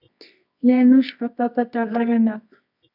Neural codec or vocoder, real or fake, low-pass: codec, 24 kHz, 0.9 kbps, WavTokenizer, medium music audio release; fake; 5.4 kHz